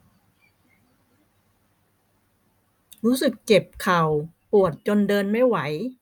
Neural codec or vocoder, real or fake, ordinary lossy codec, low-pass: none; real; none; none